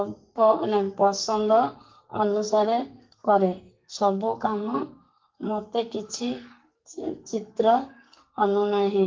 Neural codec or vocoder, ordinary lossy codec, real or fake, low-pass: codec, 44.1 kHz, 2.6 kbps, SNAC; Opus, 32 kbps; fake; 7.2 kHz